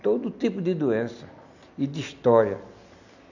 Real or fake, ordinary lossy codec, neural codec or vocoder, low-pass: real; none; none; 7.2 kHz